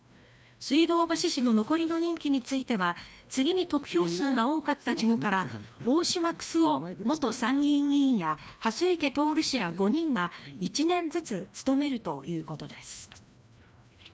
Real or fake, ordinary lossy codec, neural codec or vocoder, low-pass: fake; none; codec, 16 kHz, 1 kbps, FreqCodec, larger model; none